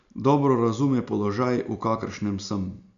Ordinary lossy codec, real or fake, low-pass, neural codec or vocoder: none; real; 7.2 kHz; none